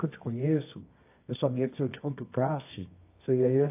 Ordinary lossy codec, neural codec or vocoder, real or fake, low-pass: AAC, 24 kbps; codec, 24 kHz, 0.9 kbps, WavTokenizer, medium music audio release; fake; 3.6 kHz